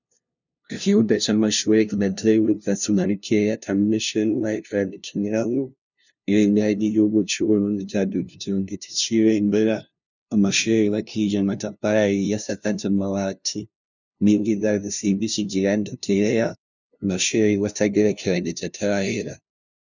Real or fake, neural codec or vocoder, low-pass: fake; codec, 16 kHz, 0.5 kbps, FunCodec, trained on LibriTTS, 25 frames a second; 7.2 kHz